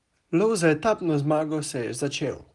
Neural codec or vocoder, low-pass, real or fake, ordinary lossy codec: none; 10.8 kHz; real; Opus, 24 kbps